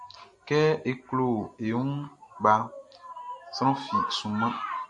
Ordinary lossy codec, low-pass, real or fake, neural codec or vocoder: AAC, 64 kbps; 10.8 kHz; real; none